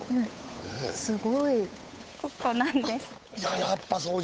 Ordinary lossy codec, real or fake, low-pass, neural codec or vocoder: none; fake; none; codec, 16 kHz, 8 kbps, FunCodec, trained on Chinese and English, 25 frames a second